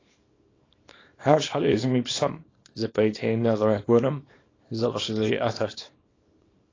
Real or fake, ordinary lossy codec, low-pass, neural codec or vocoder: fake; AAC, 32 kbps; 7.2 kHz; codec, 24 kHz, 0.9 kbps, WavTokenizer, small release